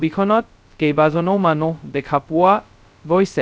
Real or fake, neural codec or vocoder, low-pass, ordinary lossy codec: fake; codec, 16 kHz, 0.2 kbps, FocalCodec; none; none